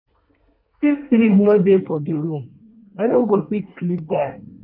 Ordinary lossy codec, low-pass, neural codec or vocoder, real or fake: none; 5.4 kHz; codec, 24 kHz, 1 kbps, SNAC; fake